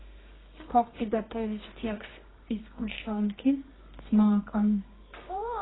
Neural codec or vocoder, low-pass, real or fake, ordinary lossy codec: codec, 24 kHz, 0.9 kbps, WavTokenizer, medium music audio release; 7.2 kHz; fake; AAC, 16 kbps